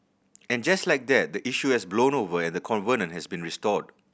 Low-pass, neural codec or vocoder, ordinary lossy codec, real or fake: none; none; none; real